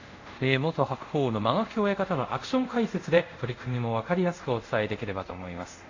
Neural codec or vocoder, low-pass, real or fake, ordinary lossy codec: codec, 24 kHz, 0.5 kbps, DualCodec; 7.2 kHz; fake; AAC, 48 kbps